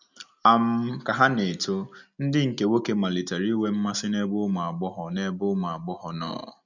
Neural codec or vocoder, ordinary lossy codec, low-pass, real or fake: none; none; 7.2 kHz; real